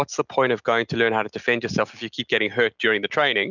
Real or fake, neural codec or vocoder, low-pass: real; none; 7.2 kHz